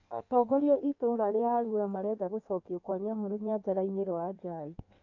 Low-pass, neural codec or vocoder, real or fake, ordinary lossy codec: 7.2 kHz; codec, 16 kHz in and 24 kHz out, 1.1 kbps, FireRedTTS-2 codec; fake; AAC, 48 kbps